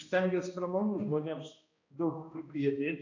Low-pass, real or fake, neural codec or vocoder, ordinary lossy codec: 7.2 kHz; fake; codec, 16 kHz, 1 kbps, X-Codec, HuBERT features, trained on balanced general audio; AAC, 48 kbps